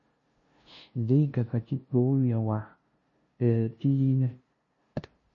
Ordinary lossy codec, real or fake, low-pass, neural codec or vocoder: MP3, 32 kbps; fake; 7.2 kHz; codec, 16 kHz, 0.5 kbps, FunCodec, trained on LibriTTS, 25 frames a second